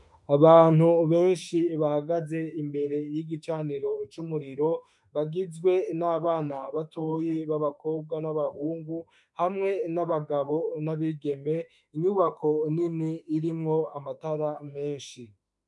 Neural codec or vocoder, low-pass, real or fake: autoencoder, 48 kHz, 32 numbers a frame, DAC-VAE, trained on Japanese speech; 10.8 kHz; fake